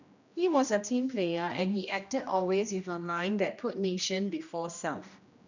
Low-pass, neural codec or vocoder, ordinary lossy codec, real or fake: 7.2 kHz; codec, 16 kHz, 1 kbps, X-Codec, HuBERT features, trained on general audio; none; fake